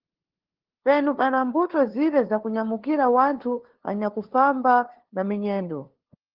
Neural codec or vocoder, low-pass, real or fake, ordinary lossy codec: codec, 16 kHz, 2 kbps, FunCodec, trained on LibriTTS, 25 frames a second; 5.4 kHz; fake; Opus, 16 kbps